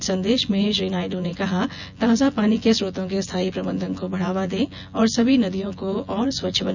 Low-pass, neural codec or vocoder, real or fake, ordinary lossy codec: 7.2 kHz; vocoder, 24 kHz, 100 mel bands, Vocos; fake; none